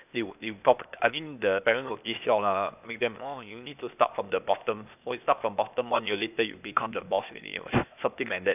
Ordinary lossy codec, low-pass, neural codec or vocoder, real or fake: none; 3.6 kHz; codec, 16 kHz, 0.8 kbps, ZipCodec; fake